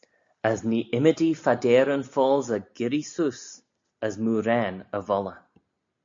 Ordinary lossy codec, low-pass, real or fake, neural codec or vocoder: MP3, 48 kbps; 7.2 kHz; real; none